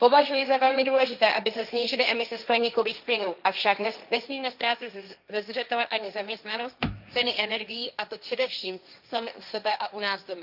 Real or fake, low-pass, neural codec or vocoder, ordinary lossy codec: fake; 5.4 kHz; codec, 16 kHz, 1.1 kbps, Voila-Tokenizer; none